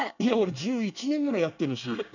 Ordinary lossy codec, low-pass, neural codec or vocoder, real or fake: none; 7.2 kHz; codec, 24 kHz, 1 kbps, SNAC; fake